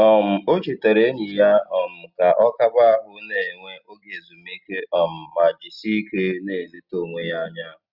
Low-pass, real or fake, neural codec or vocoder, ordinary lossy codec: 5.4 kHz; real; none; Opus, 64 kbps